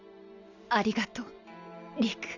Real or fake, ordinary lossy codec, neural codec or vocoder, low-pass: real; none; none; 7.2 kHz